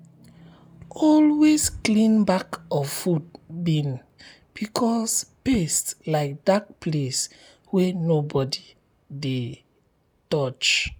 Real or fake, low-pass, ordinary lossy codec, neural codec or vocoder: real; none; none; none